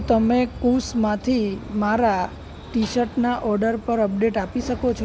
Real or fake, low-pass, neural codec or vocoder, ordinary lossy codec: real; none; none; none